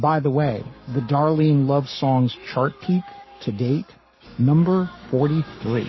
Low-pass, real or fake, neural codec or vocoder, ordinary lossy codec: 7.2 kHz; fake; codec, 44.1 kHz, 7.8 kbps, Pupu-Codec; MP3, 24 kbps